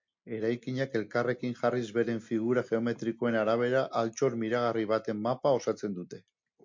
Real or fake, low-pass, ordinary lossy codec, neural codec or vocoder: real; 7.2 kHz; MP3, 48 kbps; none